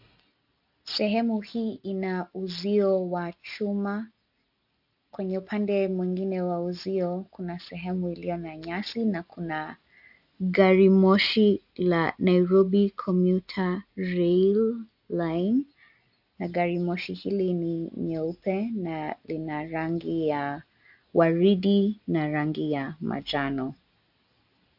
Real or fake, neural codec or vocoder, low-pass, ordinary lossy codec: real; none; 5.4 kHz; AAC, 48 kbps